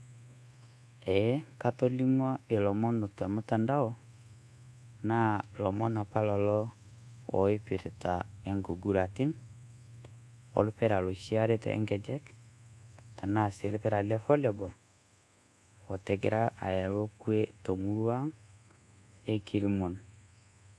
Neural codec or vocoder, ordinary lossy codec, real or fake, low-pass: codec, 24 kHz, 1.2 kbps, DualCodec; none; fake; none